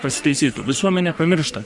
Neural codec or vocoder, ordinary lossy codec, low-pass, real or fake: codec, 44.1 kHz, 3.4 kbps, Pupu-Codec; Opus, 64 kbps; 10.8 kHz; fake